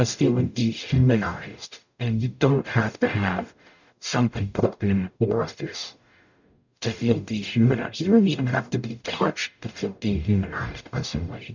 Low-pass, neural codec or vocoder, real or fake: 7.2 kHz; codec, 44.1 kHz, 0.9 kbps, DAC; fake